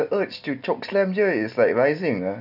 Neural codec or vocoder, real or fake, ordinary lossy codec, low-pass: none; real; none; 5.4 kHz